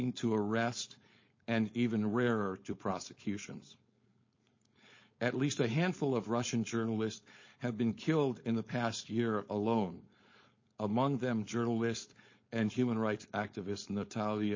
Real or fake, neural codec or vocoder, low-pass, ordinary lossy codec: fake; codec, 16 kHz, 4.8 kbps, FACodec; 7.2 kHz; MP3, 32 kbps